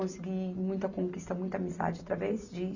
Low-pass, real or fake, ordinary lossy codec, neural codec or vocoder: 7.2 kHz; real; none; none